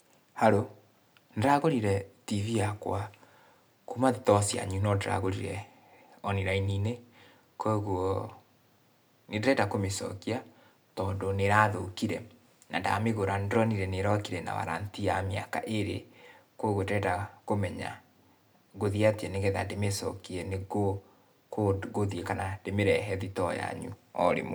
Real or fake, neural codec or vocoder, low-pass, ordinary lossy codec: real; none; none; none